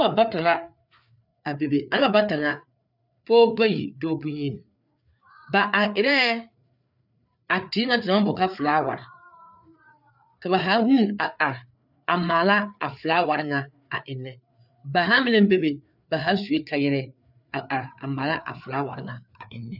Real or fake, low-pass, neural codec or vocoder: fake; 5.4 kHz; codec, 16 kHz, 4 kbps, FreqCodec, larger model